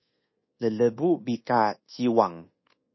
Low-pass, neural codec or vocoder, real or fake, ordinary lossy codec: 7.2 kHz; codec, 24 kHz, 1.2 kbps, DualCodec; fake; MP3, 24 kbps